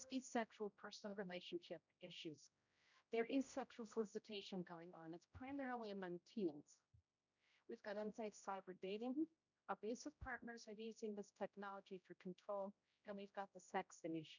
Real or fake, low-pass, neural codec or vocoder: fake; 7.2 kHz; codec, 16 kHz, 0.5 kbps, X-Codec, HuBERT features, trained on general audio